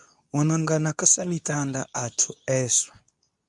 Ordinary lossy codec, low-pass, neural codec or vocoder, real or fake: MP3, 96 kbps; 10.8 kHz; codec, 24 kHz, 0.9 kbps, WavTokenizer, medium speech release version 2; fake